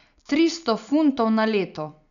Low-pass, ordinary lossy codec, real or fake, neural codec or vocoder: 7.2 kHz; none; real; none